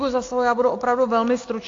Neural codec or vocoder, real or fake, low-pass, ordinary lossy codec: none; real; 7.2 kHz; AAC, 48 kbps